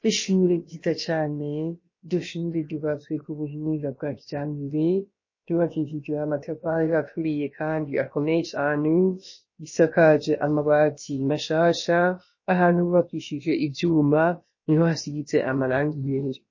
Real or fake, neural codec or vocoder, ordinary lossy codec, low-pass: fake; codec, 16 kHz, about 1 kbps, DyCAST, with the encoder's durations; MP3, 32 kbps; 7.2 kHz